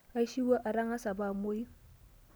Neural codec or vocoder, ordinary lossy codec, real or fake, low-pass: none; none; real; none